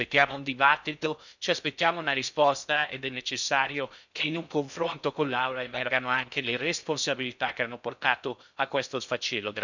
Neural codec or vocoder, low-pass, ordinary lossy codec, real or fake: codec, 16 kHz in and 24 kHz out, 0.6 kbps, FocalCodec, streaming, 4096 codes; 7.2 kHz; none; fake